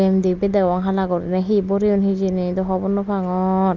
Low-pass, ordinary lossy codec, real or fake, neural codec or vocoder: 7.2 kHz; Opus, 24 kbps; real; none